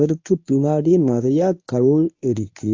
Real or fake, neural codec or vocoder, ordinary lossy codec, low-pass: fake; codec, 24 kHz, 0.9 kbps, WavTokenizer, medium speech release version 1; AAC, 48 kbps; 7.2 kHz